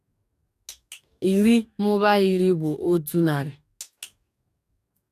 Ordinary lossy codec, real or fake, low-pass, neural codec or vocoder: none; fake; 14.4 kHz; codec, 44.1 kHz, 2.6 kbps, DAC